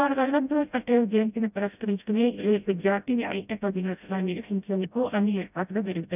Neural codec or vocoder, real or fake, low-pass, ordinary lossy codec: codec, 16 kHz, 0.5 kbps, FreqCodec, smaller model; fake; 3.6 kHz; none